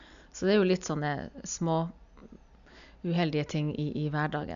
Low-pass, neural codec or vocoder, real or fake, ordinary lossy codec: 7.2 kHz; none; real; none